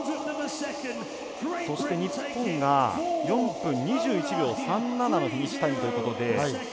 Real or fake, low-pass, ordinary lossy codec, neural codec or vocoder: real; none; none; none